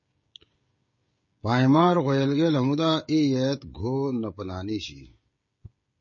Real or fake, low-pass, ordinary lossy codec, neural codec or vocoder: fake; 7.2 kHz; MP3, 32 kbps; codec, 16 kHz, 16 kbps, FreqCodec, smaller model